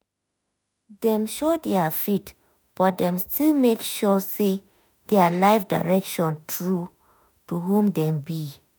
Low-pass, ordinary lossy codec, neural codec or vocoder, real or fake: none; none; autoencoder, 48 kHz, 32 numbers a frame, DAC-VAE, trained on Japanese speech; fake